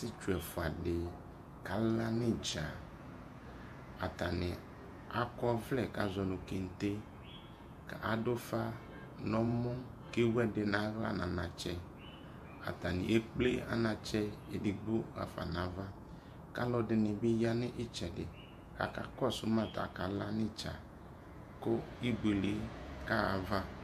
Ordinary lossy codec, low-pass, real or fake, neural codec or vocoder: AAC, 96 kbps; 14.4 kHz; fake; vocoder, 48 kHz, 128 mel bands, Vocos